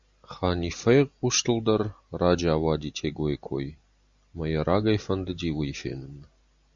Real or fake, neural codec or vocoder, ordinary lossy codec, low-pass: real; none; Opus, 64 kbps; 7.2 kHz